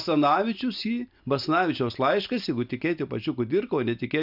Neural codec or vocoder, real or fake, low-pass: none; real; 5.4 kHz